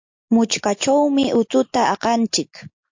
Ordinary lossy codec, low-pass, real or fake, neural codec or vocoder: MP3, 48 kbps; 7.2 kHz; real; none